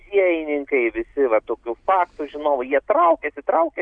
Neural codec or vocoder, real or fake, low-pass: none; real; 9.9 kHz